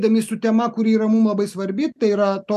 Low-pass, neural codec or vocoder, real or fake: 14.4 kHz; none; real